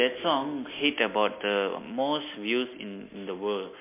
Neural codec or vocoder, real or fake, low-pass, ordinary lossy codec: none; real; 3.6 kHz; MP3, 24 kbps